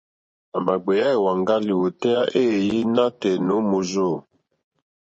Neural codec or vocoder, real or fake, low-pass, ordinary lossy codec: vocoder, 24 kHz, 100 mel bands, Vocos; fake; 10.8 kHz; MP3, 32 kbps